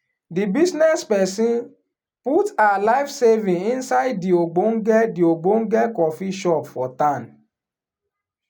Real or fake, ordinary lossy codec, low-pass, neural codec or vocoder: real; none; none; none